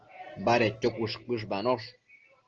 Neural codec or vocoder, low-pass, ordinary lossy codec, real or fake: none; 7.2 kHz; Opus, 16 kbps; real